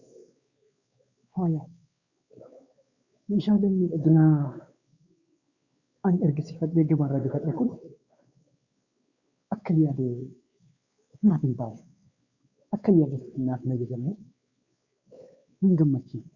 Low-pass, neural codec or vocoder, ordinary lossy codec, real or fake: 7.2 kHz; codec, 16 kHz, 4 kbps, X-Codec, WavLM features, trained on Multilingual LibriSpeech; Opus, 64 kbps; fake